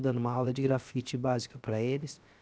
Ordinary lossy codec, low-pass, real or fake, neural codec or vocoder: none; none; fake; codec, 16 kHz, 0.7 kbps, FocalCodec